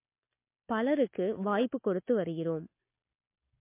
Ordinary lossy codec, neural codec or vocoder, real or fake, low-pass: MP3, 24 kbps; none; real; 3.6 kHz